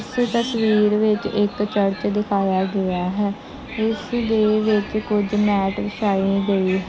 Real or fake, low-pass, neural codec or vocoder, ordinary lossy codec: real; none; none; none